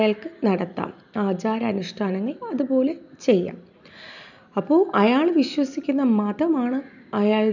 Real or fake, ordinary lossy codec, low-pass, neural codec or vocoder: real; none; 7.2 kHz; none